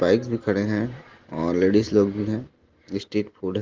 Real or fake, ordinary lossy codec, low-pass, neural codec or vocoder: real; Opus, 16 kbps; 7.2 kHz; none